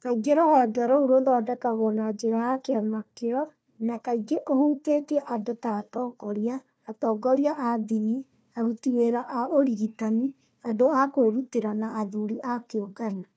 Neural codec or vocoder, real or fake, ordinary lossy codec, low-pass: codec, 16 kHz, 1 kbps, FunCodec, trained on Chinese and English, 50 frames a second; fake; none; none